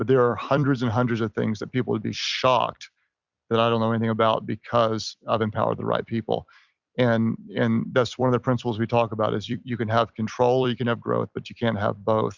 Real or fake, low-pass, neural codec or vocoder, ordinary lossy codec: fake; 7.2 kHz; vocoder, 44.1 kHz, 128 mel bands every 256 samples, BigVGAN v2; Opus, 64 kbps